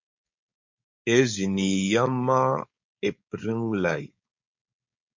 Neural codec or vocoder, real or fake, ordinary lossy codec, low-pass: codec, 16 kHz, 4.8 kbps, FACodec; fake; MP3, 48 kbps; 7.2 kHz